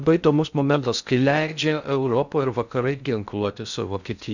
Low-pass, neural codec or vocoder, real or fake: 7.2 kHz; codec, 16 kHz in and 24 kHz out, 0.6 kbps, FocalCodec, streaming, 4096 codes; fake